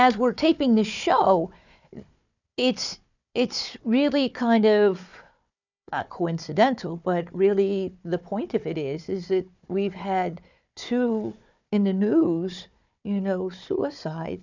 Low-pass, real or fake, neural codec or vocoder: 7.2 kHz; fake; codec, 16 kHz, 4 kbps, FunCodec, trained on Chinese and English, 50 frames a second